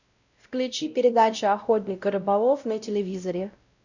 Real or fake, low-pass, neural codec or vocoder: fake; 7.2 kHz; codec, 16 kHz, 0.5 kbps, X-Codec, WavLM features, trained on Multilingual LibriSpeech